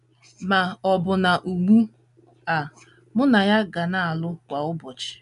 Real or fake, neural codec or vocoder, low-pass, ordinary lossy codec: real; none; 10.8 kHz; none